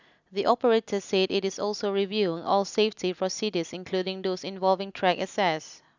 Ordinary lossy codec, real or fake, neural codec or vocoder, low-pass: none; real; none; 7.2 kHz